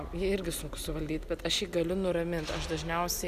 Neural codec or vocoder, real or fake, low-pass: none; real; 14.4 kHz